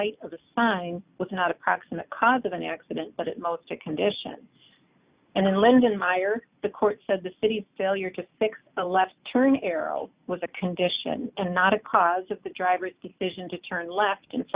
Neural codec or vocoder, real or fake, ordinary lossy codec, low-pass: none; real; Opus, 64 kbps; 3.6 kHz